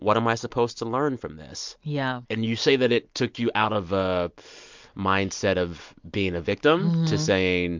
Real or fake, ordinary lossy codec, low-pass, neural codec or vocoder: real; MP3, 64 kbps; 7.2 kHz; none